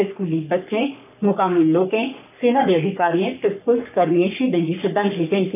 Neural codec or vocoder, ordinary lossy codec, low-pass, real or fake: codec, 44.1 kHz, 3.4 kbps, Pupu-Codec; none; 3.6 kHz; fake